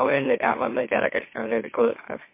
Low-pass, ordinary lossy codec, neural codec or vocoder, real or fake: 3.6 kHz; MP3, 24 kbps; autoencoder, 44.1 kHz, a latent of 192 numbers a frame, MeloTTS; fake